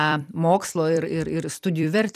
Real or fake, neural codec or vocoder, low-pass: fake; vocoder, 44.1 kHz, 128 mel bands every 256 samples, BigVGAN v2; 14.4 kHz